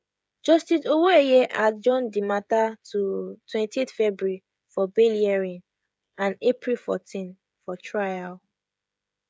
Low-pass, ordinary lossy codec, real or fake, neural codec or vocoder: none; none; fake; codec, 16 kHz, 16 kbps, FreqCodec, smaller model